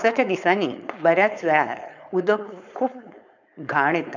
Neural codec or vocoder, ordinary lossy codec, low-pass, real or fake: codec, 16 kHz, 4.8 kbps, FACodec; none; 7.2 kHz; fake